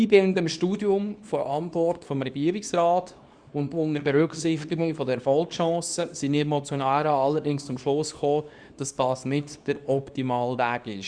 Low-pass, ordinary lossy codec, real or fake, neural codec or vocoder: 9.9 kHz; Opus, 64 kbps; fake; codec, 24 kHz, 0.9 kbps, WavTokenizer, small release